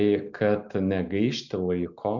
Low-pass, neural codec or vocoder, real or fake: 7.2 kHz; none; real